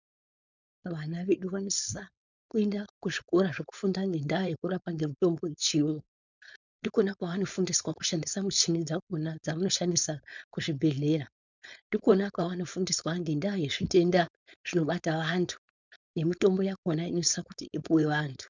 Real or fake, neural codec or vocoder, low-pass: fake; codec, 16 kHz, 4.8 kbps, FACodec; 7.2 kHz